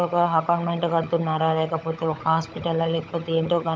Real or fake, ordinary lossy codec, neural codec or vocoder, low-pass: fake; none; codec, 16 kHz, 16 kbps, FunCodec, trained on LibriTTS, 50 frames a second; none